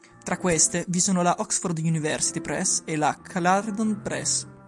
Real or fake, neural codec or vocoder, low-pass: real; none; 10.8 kHz